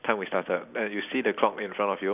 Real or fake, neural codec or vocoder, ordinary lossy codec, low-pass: real; none; none; 3.6 kHz